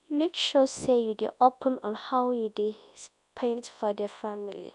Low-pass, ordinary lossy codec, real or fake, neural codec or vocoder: 10.8 kHz; none; fake; codec, 24 kHz, 0.9 kbps, WavTokenizer, large speech release